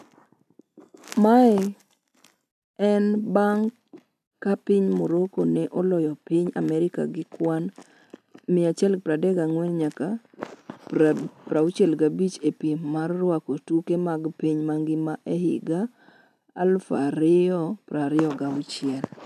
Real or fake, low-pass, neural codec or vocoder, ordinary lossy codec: real; 14.4 kHz; none; none